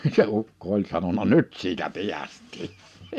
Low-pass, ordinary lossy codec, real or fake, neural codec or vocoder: 14.4 kHz; none; fake; vocoder, 44.1 kHz, 128 mel bands every 512 samples, BigVGAN v2